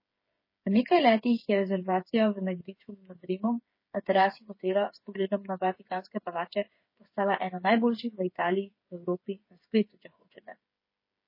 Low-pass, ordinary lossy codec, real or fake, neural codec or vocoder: 5.4 kHz; MP3, 24 kbps; fake; codec, 16 kHz, 8 kbps, FreqCodec, smaller model